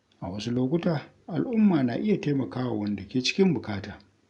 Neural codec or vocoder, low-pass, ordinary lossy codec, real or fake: none; 10.8 kHz; none; real